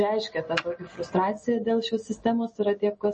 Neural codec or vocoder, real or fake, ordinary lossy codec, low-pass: none; real; MP3, 32 kbps; 10.8 kHz